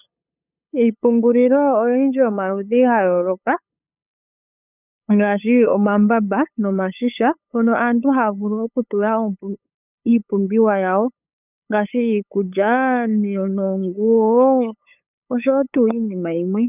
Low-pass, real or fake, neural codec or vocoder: 3.6 kHz; fake; codec, 16 kHz, 8 kbps, FunCodec, trained on LibriTTS, 25 frames a second